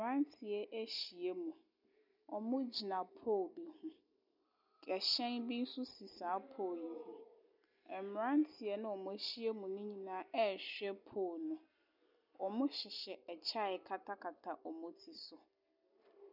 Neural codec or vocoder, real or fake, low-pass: none; real; 5.4 kHz